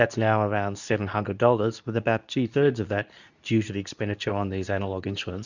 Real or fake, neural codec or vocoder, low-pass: fake; codec, 24 kHz, 0.9 kbps, WavTokenizer, medium speech release version 2; 7.2 kHz